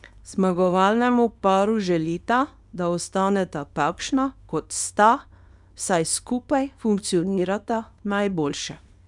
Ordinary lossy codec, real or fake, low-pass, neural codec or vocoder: none; fake; 10.8 kHz; codec, 24 kHz, 0.9 kbps, WavTokenizer, small release